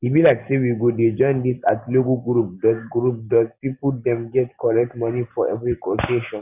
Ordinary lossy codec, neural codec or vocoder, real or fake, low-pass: none; none; real; 3.6 kHz